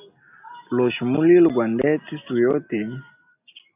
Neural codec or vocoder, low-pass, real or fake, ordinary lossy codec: none; 3.6 kHz; real; AAC, 32 kbps